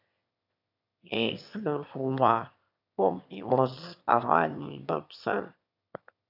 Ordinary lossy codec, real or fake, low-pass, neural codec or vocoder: AAC, 48 kbps; fake; 5.4 kHz; autoencoder, 22.05 kHz, a latent of 192 numbers a frame, VITS, trained on one speaker